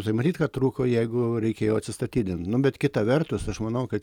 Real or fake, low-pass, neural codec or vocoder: real; 19.8 kHz; none